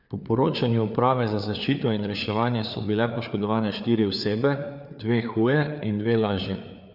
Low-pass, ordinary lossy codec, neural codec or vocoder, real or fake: 5.4 kHz; none; codec, 16 kHz, 4 kbps, FreqCodec, larger model; fake